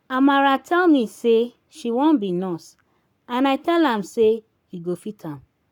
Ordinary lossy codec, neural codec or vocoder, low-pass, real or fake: none; codec, 44.1 kHz, 7.8 kbps, Pupu-Codec; 19.8 kHz; fake